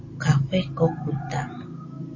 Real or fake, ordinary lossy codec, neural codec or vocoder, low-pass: real; MP3, 32 kbps; none; 7.2 kHz